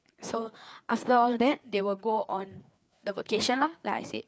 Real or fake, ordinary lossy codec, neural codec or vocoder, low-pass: fake; none; codec, 16 kHz, 4 kbps, FreqCodec, larger model; none